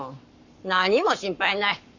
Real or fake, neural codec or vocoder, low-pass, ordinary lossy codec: fake; codec, 44.1 kHz, 7.8 kbps, Pupu-Codec; 7.2 kHz; none